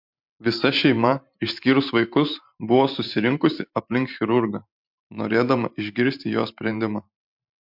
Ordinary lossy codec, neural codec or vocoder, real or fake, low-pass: MP3, 48 kbps; vocoder, 44.1 kHz, 128 mel bands every 512 samples, BigVGAN v2; fake; 5.4 kHz